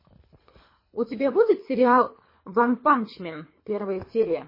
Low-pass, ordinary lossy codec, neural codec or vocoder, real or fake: 5.4 kHz; MP3, 24 kbps; codec, 24 kHz, 3 kbps, HILCodec; fake